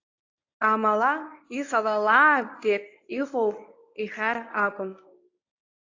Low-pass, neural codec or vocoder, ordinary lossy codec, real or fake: 7.2 kHz; codec, 24 kHz, 0.9 kbps, WavTokenizer, medium speech release version 1; AAC, 48 kbps; fake